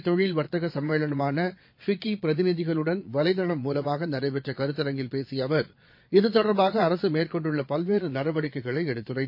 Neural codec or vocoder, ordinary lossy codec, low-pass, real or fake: vocoder, 22.05 kHz, 80 mel bands, Vocos; MP3, 32 kbps; 5.4 kHz; fake